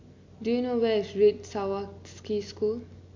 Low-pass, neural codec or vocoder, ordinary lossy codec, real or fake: 7.2 kHz; none; MP3, 64 kbps; real